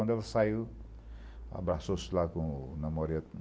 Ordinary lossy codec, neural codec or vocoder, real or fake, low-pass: none; none; real; none